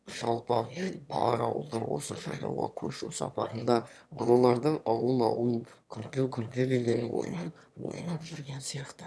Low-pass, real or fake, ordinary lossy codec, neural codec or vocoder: none; fake; none; autoencoder, 22.05 kHz, a latent of 192 numbers a frame, VITS, trained on one speaker